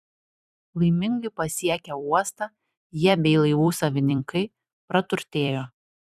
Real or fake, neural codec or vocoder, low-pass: fake; vocoder, 44.1 kHz, 128 mel bands every 256 samples, BigVGAN v2; 14.4 kHz